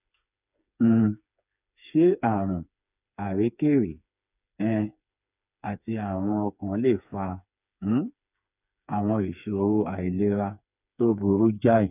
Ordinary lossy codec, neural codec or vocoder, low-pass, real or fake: none; codec, 16 kHz, 4 kbps, FreqCodec, smaller model; 3.6 kHz; fake